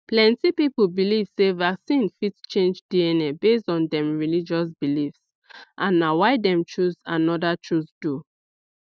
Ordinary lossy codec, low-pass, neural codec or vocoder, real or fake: none; none; none; real